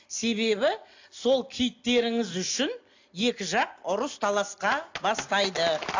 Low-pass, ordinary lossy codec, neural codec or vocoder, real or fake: 7.2 kHz; AAC, 48 kbps; none; real